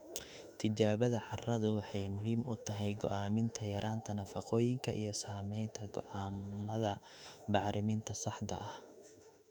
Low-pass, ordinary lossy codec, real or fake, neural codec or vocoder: 19.8 kHz; none; fake; autoencoder, 48 kHz, 32 numbers a frame, DAC-VAE, trained on Japanese speech